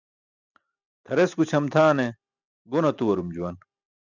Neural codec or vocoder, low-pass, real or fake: none; 7.2 kHz; real